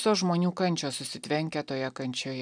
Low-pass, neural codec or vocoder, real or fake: 9.9 kHz; none; real